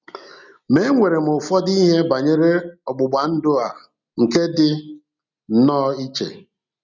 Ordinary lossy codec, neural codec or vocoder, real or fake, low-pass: none; none; real; 7.2 kHz